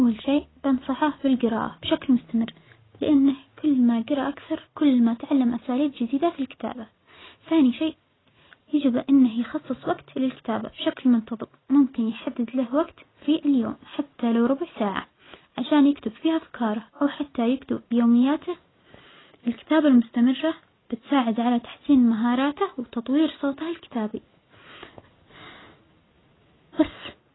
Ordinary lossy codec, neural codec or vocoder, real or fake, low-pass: AAC, 16 kbps; none; real; 7.2 kHz